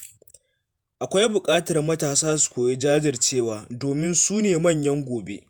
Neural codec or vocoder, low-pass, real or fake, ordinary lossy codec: vocoder, 48 kHz, 128 mel bands, Vocos; none; fake; none